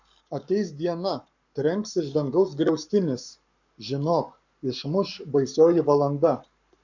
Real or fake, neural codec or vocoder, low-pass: fake; codec, 44.1 kHz, 7.8 kbps, Pupu-Codec; 7.2 kHz